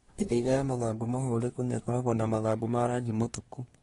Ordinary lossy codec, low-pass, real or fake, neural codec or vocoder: AAC, 32 kbps; 10.8 kHz; fake; codec, 24 kHz, 1 kbps, SNAC